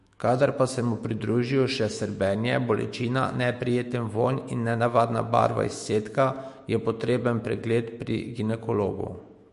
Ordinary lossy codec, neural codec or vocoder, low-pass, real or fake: MP3, 48 kbps; autoencoder, 48 kHz, 128 numbers a frame, DAC-VAE, trained on Japanese speech; 14.4 kHz; fake